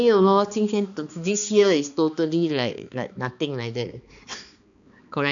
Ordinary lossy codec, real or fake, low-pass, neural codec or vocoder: none; fake; 7.2 kHz; codec, 16 kHz, 2 kbps, X-Codec, HuBERT features, trained on balanced general audio